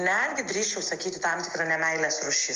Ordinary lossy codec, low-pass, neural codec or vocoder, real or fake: Opus, 32 kbps; 7.2 kHz; none; real